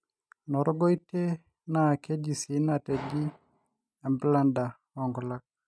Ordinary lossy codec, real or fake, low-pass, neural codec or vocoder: none; real; none; none